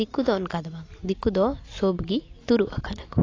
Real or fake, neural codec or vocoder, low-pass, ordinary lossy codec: real; none; 7.2 kHz; AAC, 32 kbps